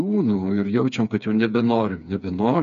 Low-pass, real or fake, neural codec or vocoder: 7.2 kHz; fake; codec, 16 kHz, 4 kbps, FreqCodec, smaller model